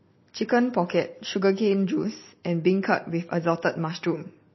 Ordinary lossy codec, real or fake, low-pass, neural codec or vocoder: MP3, 24 kbps; real; 7.2 kHz; none